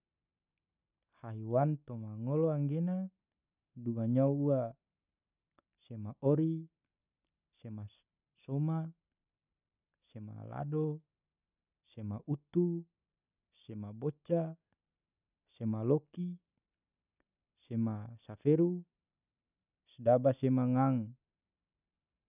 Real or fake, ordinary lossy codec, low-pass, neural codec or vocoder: real; none; 3.6 kHz; none